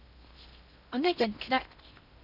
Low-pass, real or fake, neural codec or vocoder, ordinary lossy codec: 5.4 kHz; fake; codec, 16 kHz in and 24 kHz out, 0.6 kbps, FocalCodec, streaming, 2048 codes; none